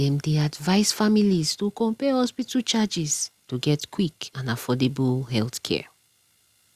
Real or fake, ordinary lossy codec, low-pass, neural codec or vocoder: real; Opus, 64 kbps; 14.4 kHz; none